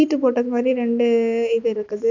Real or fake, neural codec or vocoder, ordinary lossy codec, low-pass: real; none; none; 7.2 kHz